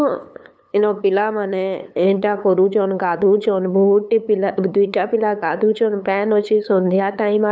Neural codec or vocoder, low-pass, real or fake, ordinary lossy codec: codec, 16 kHz, 8 kbps, FunCodec, trained on LibriTTS, 25 frames a second; none; fake; none